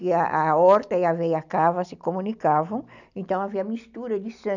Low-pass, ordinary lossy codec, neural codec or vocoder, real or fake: 7.2 kHz; none; none; real